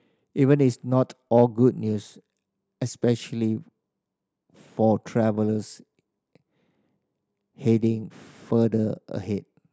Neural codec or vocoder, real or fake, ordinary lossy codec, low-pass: none; real; none; none